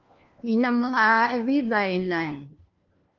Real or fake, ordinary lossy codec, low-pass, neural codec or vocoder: fake; Opus, 32 kbps; 7.2 kHz; codec, 16 kHz, 1 kbps, FunCodec, trained on LibriTTS, 50 frames a second